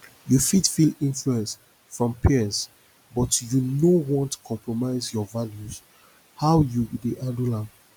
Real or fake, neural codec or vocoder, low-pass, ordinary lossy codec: real; none; none; none